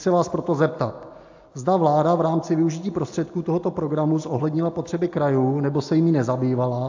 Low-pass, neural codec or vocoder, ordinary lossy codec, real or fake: 7.2 kHz; none; AAC, 48 kbps; real